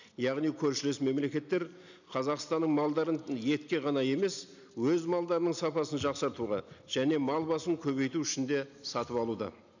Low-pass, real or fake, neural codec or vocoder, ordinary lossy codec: 7.2 kHz; real; none; none